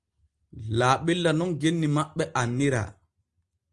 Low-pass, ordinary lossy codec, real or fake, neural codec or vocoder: 10.8 kHz; Opus, 24 kbps; real; none